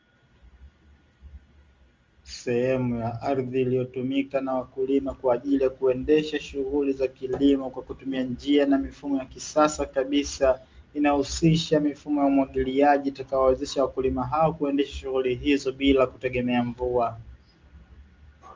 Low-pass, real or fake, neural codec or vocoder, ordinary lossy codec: 7.2 kHz; real; none; Opus, 32 kbps